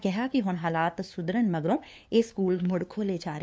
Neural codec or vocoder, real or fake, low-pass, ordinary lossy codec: codec, 16 kHz, 2 kbps, FunCodec, trained on LibriTTS, 25 frames a second; fake; none; none